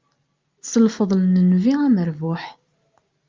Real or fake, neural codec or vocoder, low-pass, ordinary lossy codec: real; none; 7.2 kHz; Opus, 24 kbps